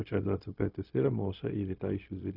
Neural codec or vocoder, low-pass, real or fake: codec, 16 kHz, 0.4 kbps, LongCat-Audio-Codec; 5.4 kHz; fake